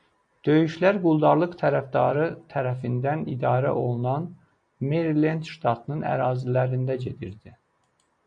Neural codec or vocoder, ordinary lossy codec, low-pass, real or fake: none; MP3, 48 kbps; 9.9 kHz; real